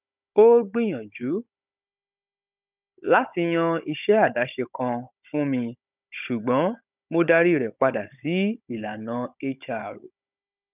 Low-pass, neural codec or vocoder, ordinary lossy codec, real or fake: 3.6 kHz; codec, 16 kHz, 16 kbps, FunCodec, trained on Chinese and English, 50 frames a second; none; fake